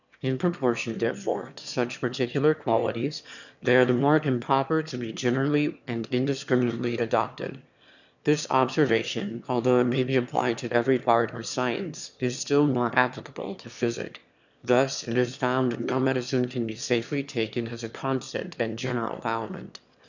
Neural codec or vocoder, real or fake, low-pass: autoencoder, 22.05 kHz, a latent of 192 numbers a frame, VITS, trained on one speaker; fake; 7.2 kHz